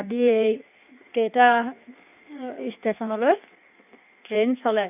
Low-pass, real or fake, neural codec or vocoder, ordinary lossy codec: 3.6 kHz; fake; codec, 16 kHz in and 24 kHz out, 1.1 kbps, FireRedTTS-2 codec; none